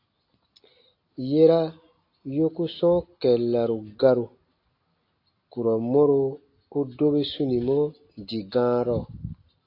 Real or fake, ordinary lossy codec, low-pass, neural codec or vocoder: real; AAC, 32 kbps; 5.4 kHz; none